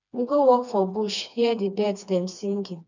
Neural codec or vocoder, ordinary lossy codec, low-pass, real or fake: codec, 16 kHz, 2 kbps, FreqCodec, smaller model; none; 7.2 kHz; fake